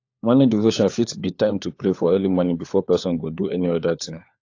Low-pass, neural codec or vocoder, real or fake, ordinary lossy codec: 7.2 kHz; codec, 16 kHz, 4 kbps, FunCodec, trained on LibriTTS, 50 frames a second; fake; AAC, 48 kbps